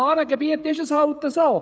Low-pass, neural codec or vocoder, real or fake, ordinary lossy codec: none; codec, 16 kHz, 8 kbps, FreqCodec, smaller model; fake; none